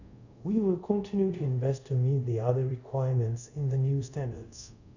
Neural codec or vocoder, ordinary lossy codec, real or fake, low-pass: codec, 24 kHz, 0.5 kbps, DualCodec; none; fake; 7.2 kHz